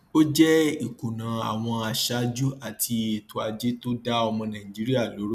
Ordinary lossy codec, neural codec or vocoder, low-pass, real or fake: none; none; 14.4 kHz; real